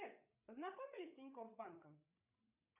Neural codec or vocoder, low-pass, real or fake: codec, 16 kHz, 8 kbps, FreqCodec, larger model; 3.6 kHz; fake